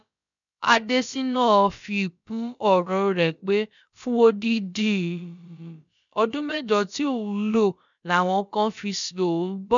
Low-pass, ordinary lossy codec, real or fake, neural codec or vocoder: 7.2 kHz; none; fake; codec, 16 kHz, about 1 kbps, DyCAST, with the encoder's durations